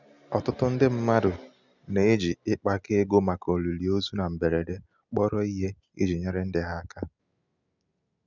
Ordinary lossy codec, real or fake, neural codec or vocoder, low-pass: none; real; none; 7.2 kHz